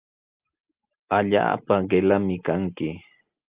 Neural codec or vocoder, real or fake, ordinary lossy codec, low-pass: none; real; Opus, 32 kbps; 3.6 kHz